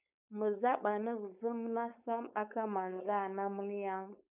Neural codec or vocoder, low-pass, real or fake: codec, 16 kHz, 4.8 kbps, FACodec; 3.6 kHz; fake